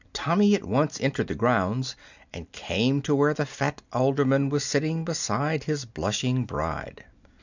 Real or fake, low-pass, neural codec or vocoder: real; 7.2 kHz; none